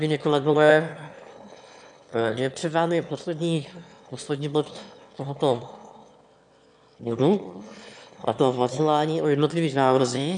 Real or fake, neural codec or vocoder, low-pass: fake; autoencoder, 22.05 kHz, a latent of 192 numbers a frame, VITS, trained on one speaker; 9.9 kHz